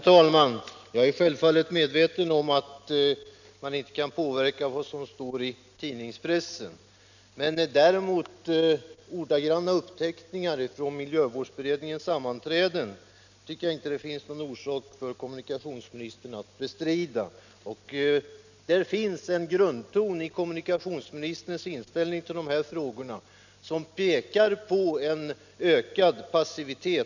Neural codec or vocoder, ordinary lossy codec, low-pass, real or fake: none; none; 7.2 kHz; real